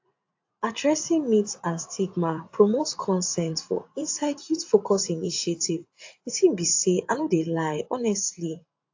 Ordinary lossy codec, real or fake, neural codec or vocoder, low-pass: AAC, 48 kbps; fake; vocoder, 44.1 kHz, 80 mel bands, Vocos; 7.2 kHz